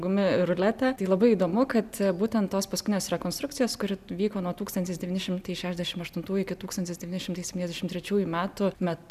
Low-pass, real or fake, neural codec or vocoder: 14.4 kHz; real; none